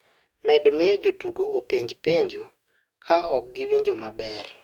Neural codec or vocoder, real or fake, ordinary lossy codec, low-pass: codec, 44.1 kHz, 2.6 kbps, DAC; fake; none; 19.8 kHz